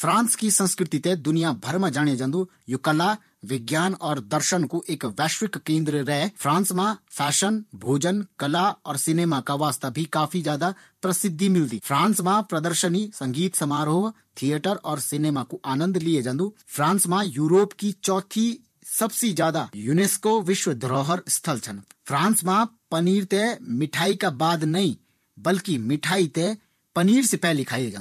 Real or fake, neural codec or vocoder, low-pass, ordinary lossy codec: fake; vocoder, 44.1 kHz, 128 mel bands, Pupu-Vocoder; 14.4 kHz; MP3, 64 kbps